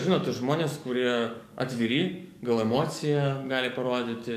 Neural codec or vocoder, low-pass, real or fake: codec, 44.1 kHz, 7.8 kbps, DAC; 14.4 kHz; fake